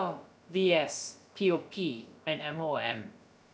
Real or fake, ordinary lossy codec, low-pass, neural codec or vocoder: fake; none; none; codec, 16 kHz, about 1 kbps, DyCAST, with the encoder's durations